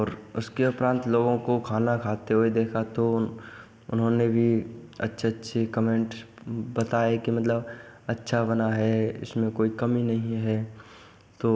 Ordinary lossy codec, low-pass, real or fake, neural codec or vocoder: none; none; real; none